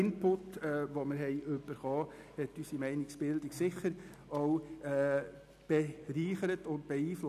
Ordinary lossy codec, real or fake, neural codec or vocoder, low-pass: none; real; none; 14.4 kHz